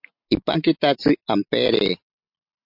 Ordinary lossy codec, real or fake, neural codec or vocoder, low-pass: MP3, 48 kbps; real; none; 5.4 kHz